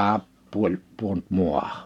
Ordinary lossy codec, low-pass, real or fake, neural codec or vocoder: none; 19.8 kHz; real; none